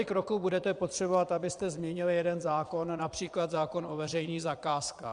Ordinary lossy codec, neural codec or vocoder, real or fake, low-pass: Opus, 64 kbps; vocoder, 22.05 kHz, 80 mel bands, Vocos; fake; 9.9 kHz